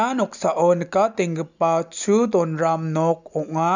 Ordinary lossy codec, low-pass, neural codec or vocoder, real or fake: none; 7.2 kHz; none; real